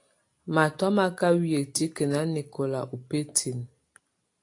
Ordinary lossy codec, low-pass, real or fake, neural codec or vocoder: AAC, 48 kbps; 10.8 kHz; real; none